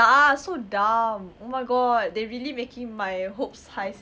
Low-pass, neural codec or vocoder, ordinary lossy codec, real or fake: none; none; none; real